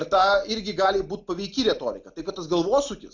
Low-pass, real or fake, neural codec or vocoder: 7.2 kHz; real; none